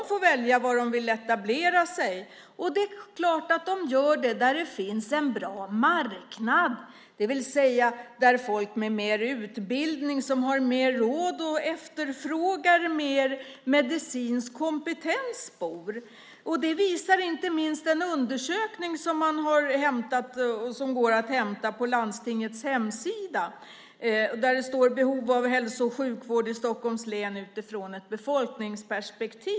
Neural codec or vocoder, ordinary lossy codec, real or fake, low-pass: none; none; real; none